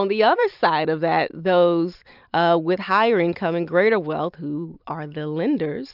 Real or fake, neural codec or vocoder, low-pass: fake; vocoder, 44.1 kHz, 128 mel bands every 256 samples, BigVGAN v2; 5.4 kHz